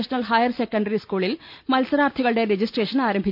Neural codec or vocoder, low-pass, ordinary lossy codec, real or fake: none; 5.4 kHz; MP3, 48 kbps; real